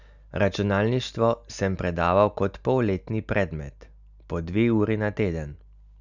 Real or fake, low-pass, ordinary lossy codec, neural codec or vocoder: real; 7.2 kHz; none; none